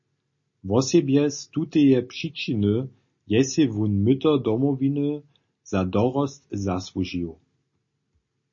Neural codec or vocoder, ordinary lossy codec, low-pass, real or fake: none; MP3, 32 kbps; 7.2 kHz; real